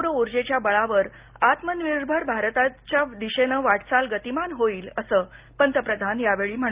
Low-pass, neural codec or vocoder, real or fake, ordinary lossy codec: 3.6 kHz; none; real; Opus, 32 kbps